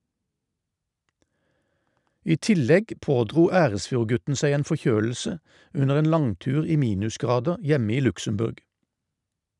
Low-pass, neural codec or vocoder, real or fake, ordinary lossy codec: 10.8 kHz; none; real; none